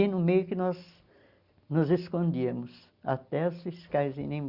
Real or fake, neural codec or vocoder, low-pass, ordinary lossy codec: real; none; 5.4 kHz; Opus, 64 kbps